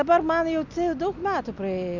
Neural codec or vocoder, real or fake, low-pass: none; real; 7.2 kHz